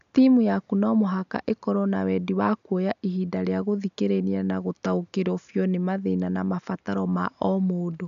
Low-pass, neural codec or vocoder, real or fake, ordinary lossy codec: 7.2 kHz; none; real; none